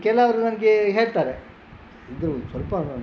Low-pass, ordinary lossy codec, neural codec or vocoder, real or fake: none; none; none; real